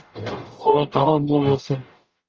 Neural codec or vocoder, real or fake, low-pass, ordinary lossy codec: codec, 44.1 kHz, 0.9 kbps, DAC; fake; 7.2 kHz; Opus, 24 kbps